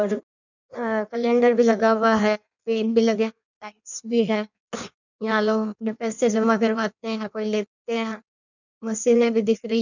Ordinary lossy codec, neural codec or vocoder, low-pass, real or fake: none; codec, 16 kHz in and 24 kHz out, 1.1 kbps, FireRedTTS-2 codec; 7.2 kHz; fake